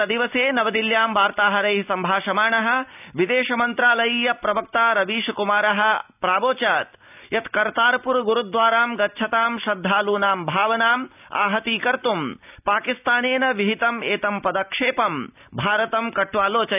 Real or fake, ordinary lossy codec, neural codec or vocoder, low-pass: real; none; none; 3.6 kHz